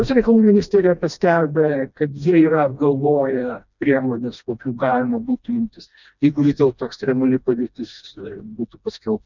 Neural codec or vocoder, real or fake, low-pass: codec, 16 kHz, 1 kbps, FreqCodec, smaller model; fake; 7.2 kHz